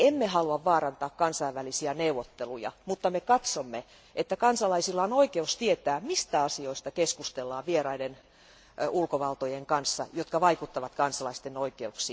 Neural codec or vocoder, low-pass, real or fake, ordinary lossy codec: none; none; real; none